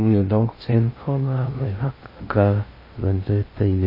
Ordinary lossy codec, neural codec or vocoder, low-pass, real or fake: MP3, 24 kbps; codec, 16 kHz in and 24 kHz out, 0.6 kbps, FocalCodec, streaming, 2048 codes; 5.4 kHz; fake